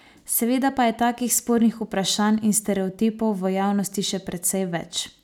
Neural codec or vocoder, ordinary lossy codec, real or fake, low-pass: none; none; real; 19.8 kHz